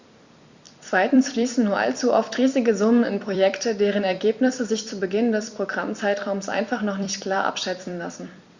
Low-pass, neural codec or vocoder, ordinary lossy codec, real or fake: 7.2 kHz; vocoder, 44.1 kHz, 128 mel bands every 256 samples, BigVGAN v2; Opus, 64 kbps; fake